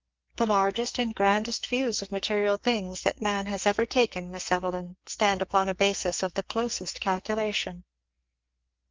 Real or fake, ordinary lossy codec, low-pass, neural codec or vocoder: fake; Opus, 24 kbps; 7.2 kHz; codec, 44.1 kHz, 2.6 kbps, SNAC